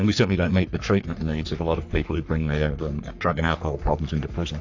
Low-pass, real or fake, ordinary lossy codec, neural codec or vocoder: 7.2 kHz; fake; AAC, 48 kbps; codec, 44.1 kHz, 3.4 kbps, Pupu-Codec